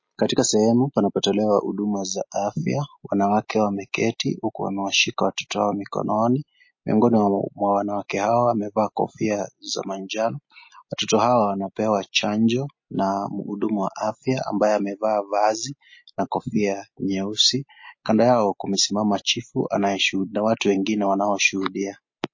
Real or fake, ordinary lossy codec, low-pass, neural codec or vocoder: real; MP3, 32 kbps; 7.2 kHz; none